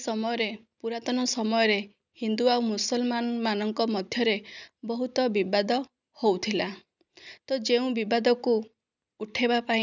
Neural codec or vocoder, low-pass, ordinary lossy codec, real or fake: none; 7.2 kHz; none; real